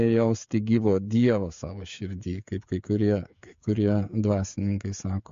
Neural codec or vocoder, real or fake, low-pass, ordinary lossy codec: codec, 16 kHz, 16 kbps, FreqCodec, smaller model; fake; 7.2 kHz; MP3, 48 kbps